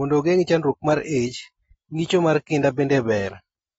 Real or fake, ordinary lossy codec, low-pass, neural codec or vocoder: real; AAC, 24 kbps; 19.8 kHz; none